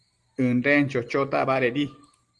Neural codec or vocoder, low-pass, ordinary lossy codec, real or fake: none; 10.8 kHz; Opus, 24 kbps; real